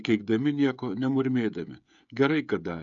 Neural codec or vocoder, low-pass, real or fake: codec, 16 kHz, 16 kbps, FreqCodec, smaller model; 7.2 kHz; fake